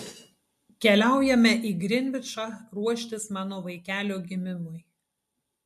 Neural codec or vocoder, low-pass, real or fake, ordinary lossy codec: none; 14.4 kHz; real; MP3, 64 kbps